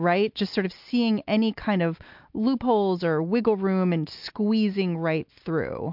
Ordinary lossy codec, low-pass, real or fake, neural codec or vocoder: MP3, 48 kbps; 5.4 kHz; real; none